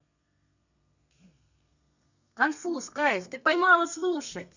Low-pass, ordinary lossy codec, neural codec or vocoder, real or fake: 7.2 kHz; none; codec, 32 kHz, 1.9 kbps, SNAC; fake